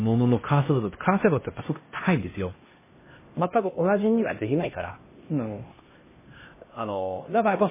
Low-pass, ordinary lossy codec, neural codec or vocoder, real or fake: 3.6 kHz; MP3, 16 kbps; codec, 16 kHz, 1 kbps, X-Codec, HuBERT features, trained on LibriSpeech; fake